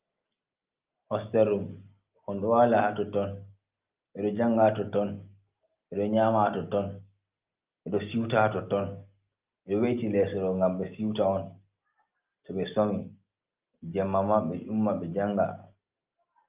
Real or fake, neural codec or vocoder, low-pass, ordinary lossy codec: real; none; 3.6 kHz; Opus, 32 kbps